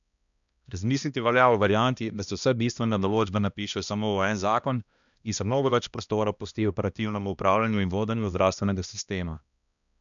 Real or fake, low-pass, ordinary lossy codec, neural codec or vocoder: fake; 7.2 kHz; none; codec, 16 kHz, 1 kbps, X-Codec, HuBERT features, trained on balanced general audio